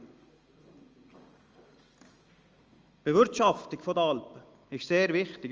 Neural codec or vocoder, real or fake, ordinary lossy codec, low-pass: none; real; Opus, 24 kbps; 7.2 kHz